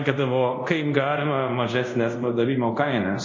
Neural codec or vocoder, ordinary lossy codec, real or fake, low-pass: codec, 24 kHz, 0.5 kbps, DualCodec; MP3, 32 kbps; fake; 7.2 kHz